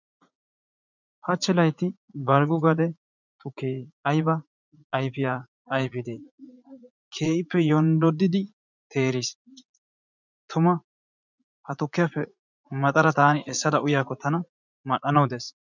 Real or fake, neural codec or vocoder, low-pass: fake; vocoder, 44.1 kHz, 80 mel bands, Vocos; 7.2 kHz